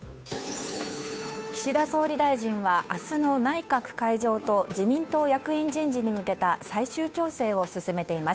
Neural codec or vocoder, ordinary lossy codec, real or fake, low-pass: codec, 16 kHz, 2 kbps, FunCodec, trained on Chinese and English, 25 frames a second; none; fake; none